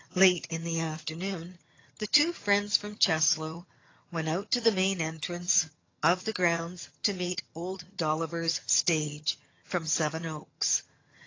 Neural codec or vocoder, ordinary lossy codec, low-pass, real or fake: vocoder, 22.05 kHz, 80 mel bands, HiFi-GAN; AAC, 32 kbps; 7.2 kHz; fake